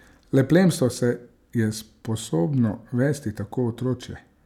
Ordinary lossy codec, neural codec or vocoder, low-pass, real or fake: none; none; 19.8 kHz; real